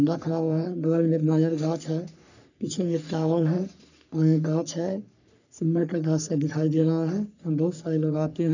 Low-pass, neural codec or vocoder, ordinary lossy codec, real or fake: 7.2 kHz; codec, 44.1 kHz, 3.4 kbps, Pupu-Codec; none; fake